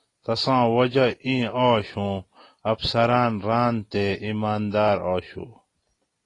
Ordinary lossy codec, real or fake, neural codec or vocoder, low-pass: AAC, 32 kbps; real; none; 10.8 kHz